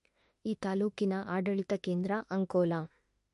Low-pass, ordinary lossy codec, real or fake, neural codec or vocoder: 14.4 kHz; MP3, 48 kbps; fake; autoencoder, 48 kHz, 32 numbers a frame, DAC-VAE, trained on Japanese speech